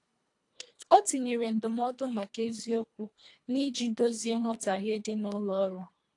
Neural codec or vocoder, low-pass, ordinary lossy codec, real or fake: codec, 24 kHz, 1.5 kbps, HILCodec; 10.8 kHz; AAC, 48 kbps; fake